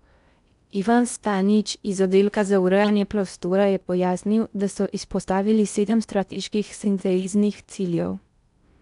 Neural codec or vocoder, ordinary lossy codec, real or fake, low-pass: codec, 16 kHz in and 24 kHz out, 0.6 kbps, FocalCodec, streaming, 4096 codes; none; fake; 10.8 kHz